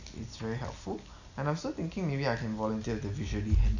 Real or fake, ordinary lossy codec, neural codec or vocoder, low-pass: real; none; none; 7.2 kHz